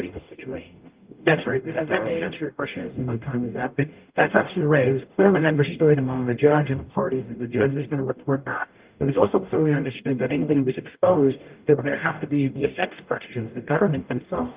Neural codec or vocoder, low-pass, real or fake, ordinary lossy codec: codec, 44.1 kHz, 0.9 kbps, DAC; 3.6 kHz; fake; Opus, 24 kbps